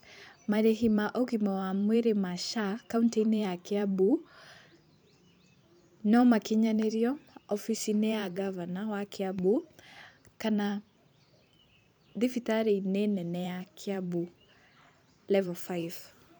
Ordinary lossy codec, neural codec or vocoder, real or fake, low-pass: none; vocoder, 44.1 kHz, 128 mel bands every 512 samples, BigVGAN v2; fake; none